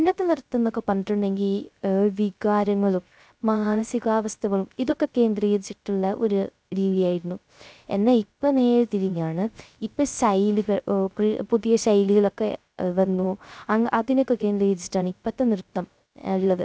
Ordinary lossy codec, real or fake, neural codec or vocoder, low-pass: none; fake; codec, 16 kHz, 0.3 kbps, FocalCodec; none